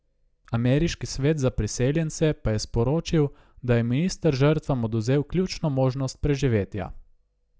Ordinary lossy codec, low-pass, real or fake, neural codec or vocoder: none; none; real; none